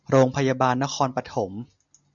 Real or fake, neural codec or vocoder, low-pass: real; none; 7.2 kHz